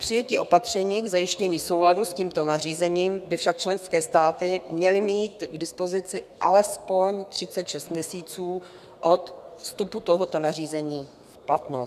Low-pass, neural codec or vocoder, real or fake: 14.4 kHz; codec, 32 kHz, 1.9 kbps, SNAC; fake